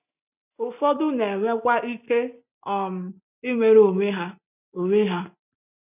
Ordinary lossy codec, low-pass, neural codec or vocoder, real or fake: none; 3.6 kHz; none; real